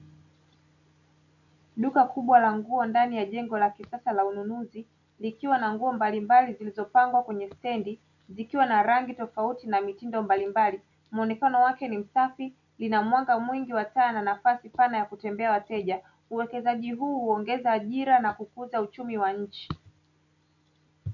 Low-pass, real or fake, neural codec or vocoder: 7.2 kHz; real; none